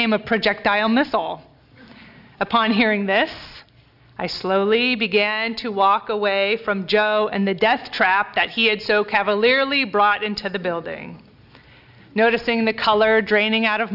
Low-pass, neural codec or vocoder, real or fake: 5.4 kHz; none; real